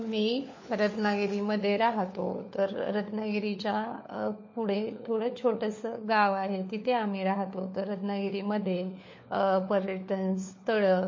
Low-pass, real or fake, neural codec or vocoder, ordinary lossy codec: 7.2 kHz; fake; codec, 16 kHz, 4 kbps, FunCodec, trained on LibriTTS, 50 frames a second; MP3, 32 kbps